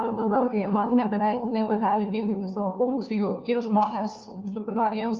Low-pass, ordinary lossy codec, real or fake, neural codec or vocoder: 7.2 kHz; Opus, 24 kbps; fake; codec, 16 kHz, 1 kbps, FunCodec, trained on LibriTTS, 50 frames a second